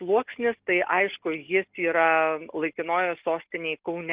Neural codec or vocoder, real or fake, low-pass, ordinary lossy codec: none; real; 3.6 kHz; Opus, 32 kbps